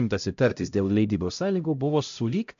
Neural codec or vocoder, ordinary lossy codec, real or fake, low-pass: codec, 16 kHz, 0.5 kbps, X-Codec, HuBERT features, trained on LibriSpeech; AAC, 64 kbps; fake; 7.2 kHz